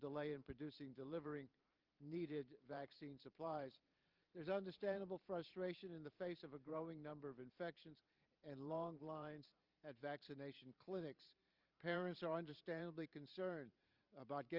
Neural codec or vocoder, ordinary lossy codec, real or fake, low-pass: vocoder, 44.1 kHz, 128 mel bands every 512 samples, BigVGAN v2; Opus, 32 kbps; fake; 5.4 kHz